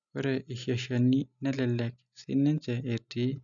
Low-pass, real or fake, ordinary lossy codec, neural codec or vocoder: 7.2 kHz; real; none; none